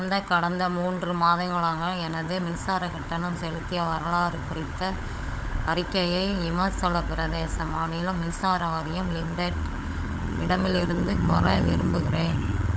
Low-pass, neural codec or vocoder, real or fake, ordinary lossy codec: none; codec, 16 kHz, 16 kbps, FunCodec, trained on LibriTTS, 50 frames a second; fake; none